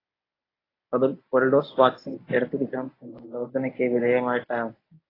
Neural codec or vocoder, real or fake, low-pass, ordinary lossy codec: codec, 24 kHz, 0.9 kbps, WavTokenizer, medium speech release version 1; fake; 5.4 kHz; AAC, 24 kbps